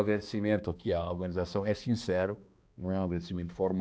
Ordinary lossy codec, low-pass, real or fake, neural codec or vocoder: none; none; fake; codec, 16 kHz, 2 kbps, X-Codec, HuBERT features, trained on balanced general audio